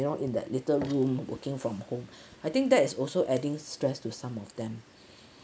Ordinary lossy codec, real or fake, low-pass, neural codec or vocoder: none; real; none; none